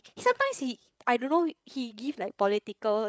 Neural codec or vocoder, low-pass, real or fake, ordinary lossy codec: codec, 16 kHz, 8 kbps, FreqCodec, larger model; none; fake; none